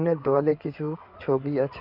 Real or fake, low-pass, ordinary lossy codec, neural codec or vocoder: fake; 5.4 kHz; none; codec, 16 kHz in and 24 kHz out, 2.2 kbps, FireRedTTS-2 codec